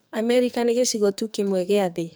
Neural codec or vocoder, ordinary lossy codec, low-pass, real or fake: codec, 44.1 kHz, 2.6 kbps, SNAC; none; none; fake